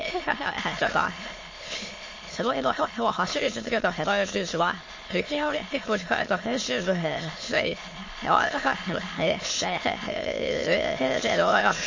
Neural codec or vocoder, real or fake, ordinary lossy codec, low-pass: autoencoder, 22.05 kHz, a latent of 192 numbers a frame, VITS, trained on many speakers; fake; MP3, 32 kbps; 7.2 kHz